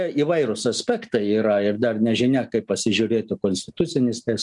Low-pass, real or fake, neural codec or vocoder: 10.8 kHz; real; none